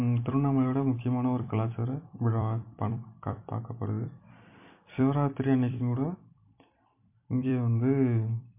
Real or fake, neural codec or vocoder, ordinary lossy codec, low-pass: fake; vocoder, 44.1 kHz, 128 mel bands every 256 samples, BigVGAN v2; MP3, 24 kbps; 3.6 kHz